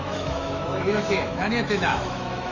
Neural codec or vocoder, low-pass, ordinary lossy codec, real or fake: autoencoder, 48 kHz, 128 numbers a frame, DAC-VAE, trained on Japanese speech; 7.2 kHz; none; fake